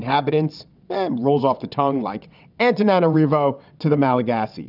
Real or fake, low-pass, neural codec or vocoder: fake; 5.4 kHz; vocoder, 22.05 kHz, 80 mel bands, WaveNeXt